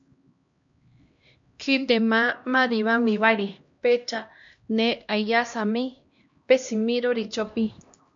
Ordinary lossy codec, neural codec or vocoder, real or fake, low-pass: MP3, 48 kbps; codec, 16 kHz, 1 kbps, X-Codec, HuBERT features, trained on LibriSpeech; fake; 7.2 kHz